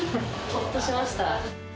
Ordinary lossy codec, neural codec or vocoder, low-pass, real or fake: none; none; none; real